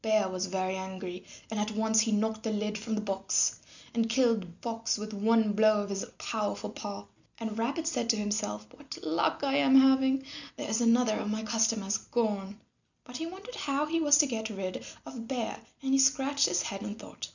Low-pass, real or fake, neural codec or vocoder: 7.2 kHz; real; none